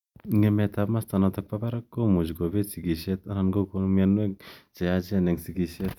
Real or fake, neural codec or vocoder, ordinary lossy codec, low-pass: real; none; none; 19.8 kHz